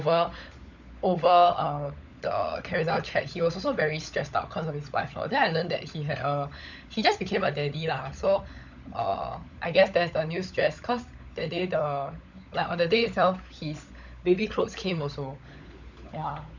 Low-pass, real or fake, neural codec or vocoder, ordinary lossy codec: 7.2 kHz; fake; codec, 16 kHz, 16 kbps, FunCodec, trained on LibriTTS, 50 frames a second; none